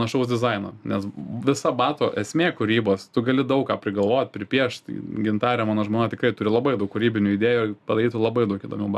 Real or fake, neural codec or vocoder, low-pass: real; none; 14.4 kHz